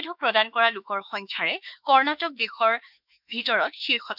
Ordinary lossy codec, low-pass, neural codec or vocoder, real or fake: none; 5.4 kHz; autoencoder, 48 kHz, 32 numbers a frame, DAC-VAE, trained on Japanese speech; fake